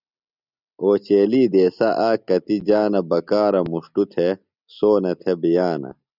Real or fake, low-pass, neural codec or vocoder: real; 5.4 kHz; none